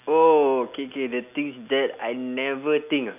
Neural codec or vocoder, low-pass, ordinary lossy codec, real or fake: none; 3.6 kHz; none; real